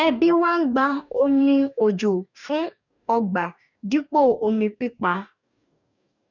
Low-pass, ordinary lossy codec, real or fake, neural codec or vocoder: 7.2 kHz; none; fake; codec, 44.1 kHz, 2.6 kbps, DAC